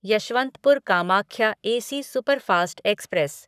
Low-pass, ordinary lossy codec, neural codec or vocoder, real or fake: 14.4 kHz; none; codec, 44.1 kHz, 7.8 kbps, Pupu-Codec; fake